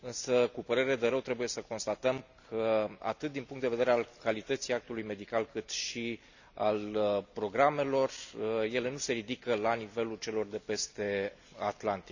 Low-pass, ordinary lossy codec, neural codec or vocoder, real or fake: 7.2 kHz; none; none; real